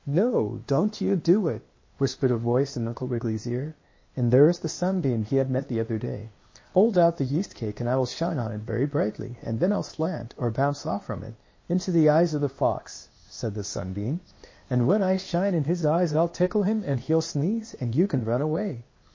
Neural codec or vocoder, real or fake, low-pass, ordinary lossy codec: codec, 16 kHz, 0.8 kbps, ZipCodec; fake; 7.2 kHz; MP3, 32 kbps